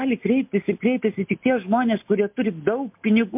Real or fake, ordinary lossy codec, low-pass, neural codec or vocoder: real; MP3, 32 kbps; 3.6 kHz; none